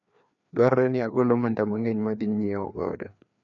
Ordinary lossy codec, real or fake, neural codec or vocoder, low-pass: none; fake; codec, 16 kHz, 2 kbps, FreqCodec, larger model; 7.2 kHz